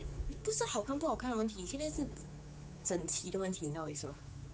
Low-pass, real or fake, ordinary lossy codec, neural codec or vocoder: none; fake; none; codec, 16 kHz, 4 kbps, X-Codec, HuBERT features, trained on general audio